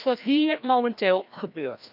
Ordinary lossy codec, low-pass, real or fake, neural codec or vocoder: none; 5.4 kHz; fake; codec, 16 kHz, 1 kbps, FreqCodec, larger model